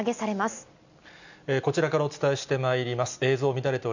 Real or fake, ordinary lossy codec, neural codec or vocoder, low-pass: real; none; none; 7.2 kHz